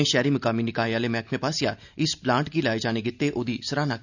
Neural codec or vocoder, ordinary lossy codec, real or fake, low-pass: none; none; real; 7.2 kHz